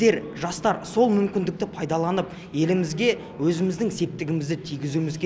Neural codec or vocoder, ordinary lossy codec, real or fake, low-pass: none; none; real; none